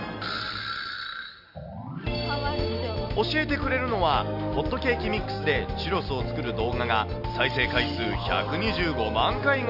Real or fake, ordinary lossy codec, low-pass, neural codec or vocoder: real; Opus, 64 kbps; 5.4 kHz; none